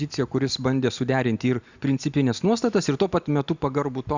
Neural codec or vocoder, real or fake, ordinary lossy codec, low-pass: none; real; Opus, 64 kbps; 7.2 kHz